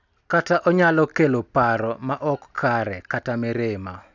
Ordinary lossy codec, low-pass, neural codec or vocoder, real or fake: none; 7.2 kHz; none; real